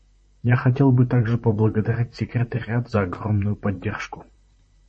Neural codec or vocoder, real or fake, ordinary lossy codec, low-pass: vocoder, 44.1 kHz, 128 mel bands, Pupu-Vocoder; fake; MP3, 32 kbps; 10.8 kHz